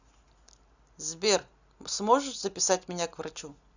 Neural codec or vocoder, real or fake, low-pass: none; real; 7.2 kHz